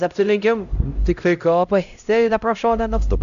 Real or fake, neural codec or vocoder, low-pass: fake; codec, 16 kHz, 0.5 kbps, X-Codec, HuBERT features, trained on LibriSpeech; 7.2 kHz